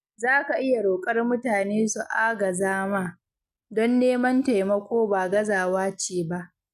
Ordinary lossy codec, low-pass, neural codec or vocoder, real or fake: none; 14.4 kHz; none; real